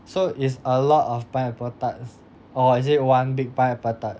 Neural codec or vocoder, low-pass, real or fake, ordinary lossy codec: none; none; real; none